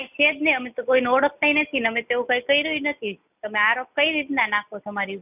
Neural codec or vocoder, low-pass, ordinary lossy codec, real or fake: none; 3.6 kHz; none; real